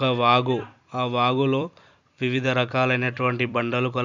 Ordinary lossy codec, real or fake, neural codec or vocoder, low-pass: Opus, 64 kbps; fake; vocoder, 44.1 kHz, 128 mel bands every 256 samples, BigVGAN v2; 7.2 kHz